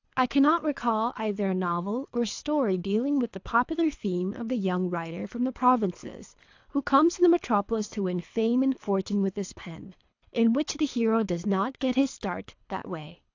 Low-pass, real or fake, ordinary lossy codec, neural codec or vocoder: 7.2 kHz; fake; AAC, 48 kbps; codec, 24 kHz, 3 kbps, HILCodec